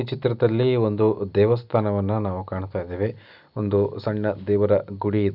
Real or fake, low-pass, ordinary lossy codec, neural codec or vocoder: real; 5.4 kHz; none; none